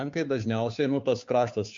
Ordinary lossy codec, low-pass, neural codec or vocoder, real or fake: MP3, 48 kbps; 7.2 kHz; codec, 16 kHz, 2 kbps, FunCodec, trained on Chinese and English, 25 frames a second; fake